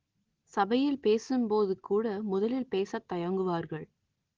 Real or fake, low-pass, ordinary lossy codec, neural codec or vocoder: real; 7.2 kHz; Opus, 16 kbps; none